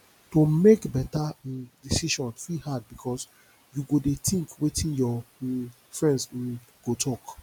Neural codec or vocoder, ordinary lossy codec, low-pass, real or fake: none; none; 19.8 kHz; real